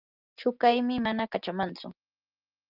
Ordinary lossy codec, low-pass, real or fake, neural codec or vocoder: Opus, 32 kbps; 5.4 kHz; real; none